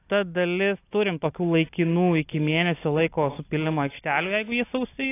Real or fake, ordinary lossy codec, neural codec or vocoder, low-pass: real; AAC, 24 kbps; none; 3.6 kHz